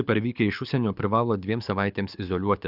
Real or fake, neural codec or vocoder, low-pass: fake; codec, 24 kHz, 6 kbps, HILCodec; 5.4 kHz